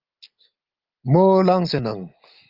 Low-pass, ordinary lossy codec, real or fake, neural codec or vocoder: 5.4 kHz; Opus, 32 kbps; real; none